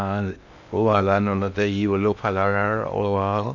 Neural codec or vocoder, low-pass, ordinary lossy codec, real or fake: codec, 16 kHz in and 24 kHz out, 0.6 kbps, FocalCodec, streaming, 2048 codes; 7.2 kHz; none; fake